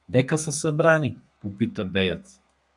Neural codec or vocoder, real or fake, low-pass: codec, 32 kHz, 1.9 kbps, SNAC; fake; 10.8 kHz